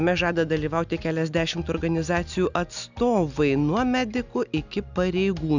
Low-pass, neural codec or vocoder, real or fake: 7.2 kHz; none; real